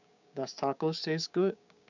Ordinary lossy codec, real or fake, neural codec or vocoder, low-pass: none; fake; codec, 16 kHz, 6 kbps, DAC; 7.2 kHz